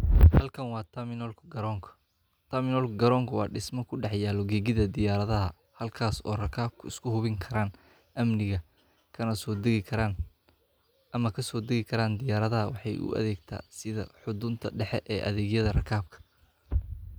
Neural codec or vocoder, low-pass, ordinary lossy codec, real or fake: none; none; none; real